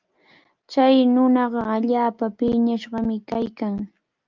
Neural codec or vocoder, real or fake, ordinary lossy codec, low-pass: none; real; Opus, 24 kbps; 7.2 kHz